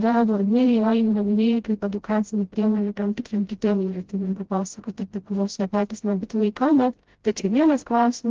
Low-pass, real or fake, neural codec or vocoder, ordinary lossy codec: 7.2 kHz; fake; codec, 16 kHz, 0.5 kbps, FreqCodec, smaller model; Opus, 16 kbps